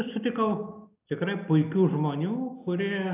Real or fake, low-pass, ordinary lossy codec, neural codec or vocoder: real; 3.6 kHz; AAC, 32 kbps; none